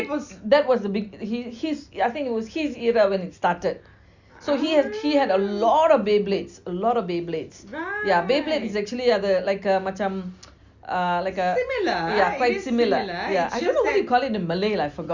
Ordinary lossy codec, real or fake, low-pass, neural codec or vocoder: Opus, 64 kbps; real; 7.2 kHz; none